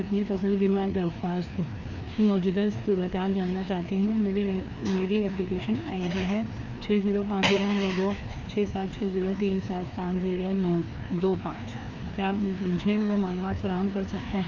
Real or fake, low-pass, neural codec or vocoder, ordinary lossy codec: fake; 7.2 kHz; codec, 16 kHz, 2 kbps, FreqCodec, larger model; none